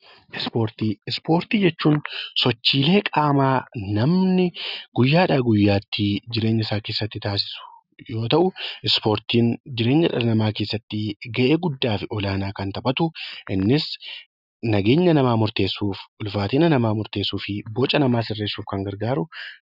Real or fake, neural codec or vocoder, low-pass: real; none; 5.4 kHz